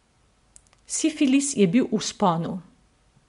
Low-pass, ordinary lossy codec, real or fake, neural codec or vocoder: 10.8 kHz; MP3, 64 kbps; real; none